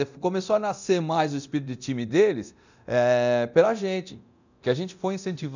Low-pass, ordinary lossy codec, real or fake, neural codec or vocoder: 7.2 kHz; none; fake; codec, 24 kHz, 0.9 kbps, DualCodec